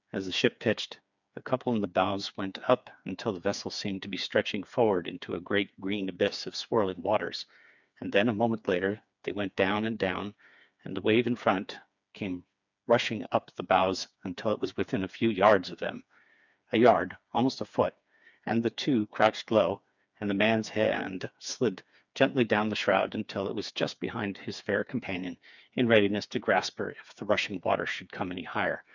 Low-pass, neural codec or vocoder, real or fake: 7.2 kHz; codec, 16 kHz, 4 kbps, FreqCodec, smaller model; fake